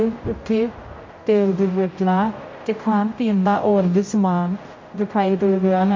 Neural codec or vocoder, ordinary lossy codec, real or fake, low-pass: codec, 16 kHz, 0.5 kbps, X-Codec, HuBERT features, trained on general audio; MP3, 32 kbps; fake; 7.2 kHz